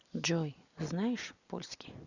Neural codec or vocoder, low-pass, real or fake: none; 7.2 kHz; real